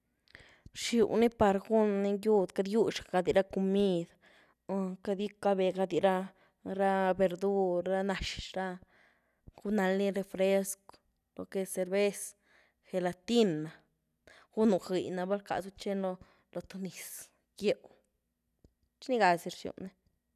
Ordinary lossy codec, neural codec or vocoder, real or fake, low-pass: none; none; real; 14.4 kHz